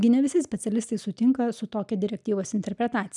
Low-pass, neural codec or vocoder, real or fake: 10.8 kHz; none; real